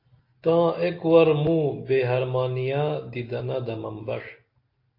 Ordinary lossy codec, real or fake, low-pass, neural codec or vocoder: AAC, 32 kbps; real; 5.4 kHz; none